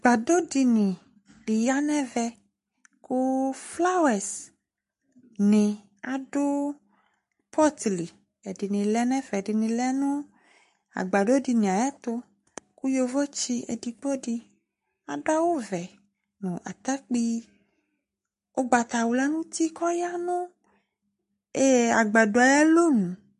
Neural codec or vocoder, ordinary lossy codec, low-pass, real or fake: codec, 44.1 kHz, 7.8 kbps, Pupu-Codec; MP3, 48 kbps; 14.4 kHz; fake